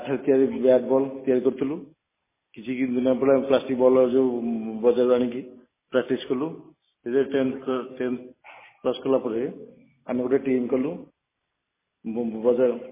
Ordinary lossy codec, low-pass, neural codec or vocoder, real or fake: MP3, 16 kbps; 3.6 kHz; none; real